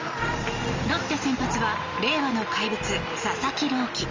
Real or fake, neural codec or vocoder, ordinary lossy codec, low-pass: real; none; Opus, 32 kbps; 7.2 kHz